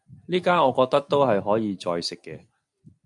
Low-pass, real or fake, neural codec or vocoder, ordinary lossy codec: 10.8 kHz; real; none; MP3, 64 kbps